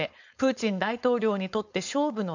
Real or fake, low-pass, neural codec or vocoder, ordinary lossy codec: fake; 7.2 kHz; codec, 16 kHz, 4.8 kbps, FACodec; none